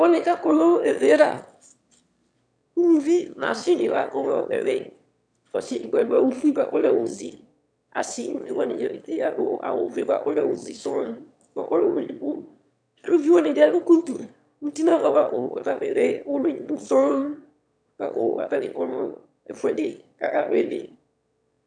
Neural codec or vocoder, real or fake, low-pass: autoencoder, 22.05 kHz, a latent of 192 numbers a frame, VITS, trained on one speaker; fake; 9.9 kHz